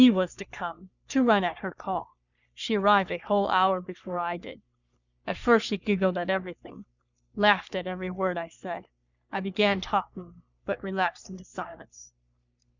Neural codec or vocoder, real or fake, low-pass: codec, 44.1 kHz, 3.4 kbps, Pupu-Codec; fake; 7.2 kHz